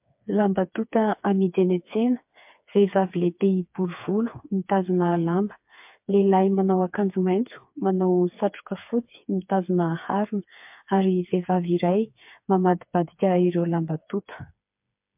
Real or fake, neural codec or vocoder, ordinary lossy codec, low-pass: fake; codec, 16 kHz, 4 kbps, FreqCodec, smaller model; MP3, 32 kbps; 3.6 kHz